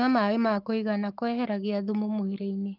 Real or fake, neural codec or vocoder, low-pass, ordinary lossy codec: fake; codec, 44.1 kHz, 7.8 kbps, Pupu-Codec; 5.4 kHz; Opus, 32 kbps